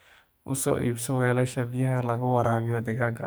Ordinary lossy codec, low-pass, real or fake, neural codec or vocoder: none; none; fake; codec, 44.1 kHz, 2.6 kbps, SNAC